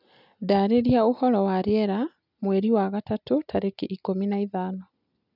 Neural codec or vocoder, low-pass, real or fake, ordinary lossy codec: none; 5.4 kHz; real; none